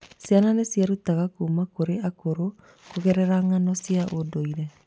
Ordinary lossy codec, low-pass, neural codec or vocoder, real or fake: none; none; none; real